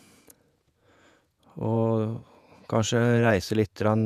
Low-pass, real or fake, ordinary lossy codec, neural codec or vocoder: 14.4 kHz; fake; none; vocoder, 48 kHz, 128 mel bands, Vocos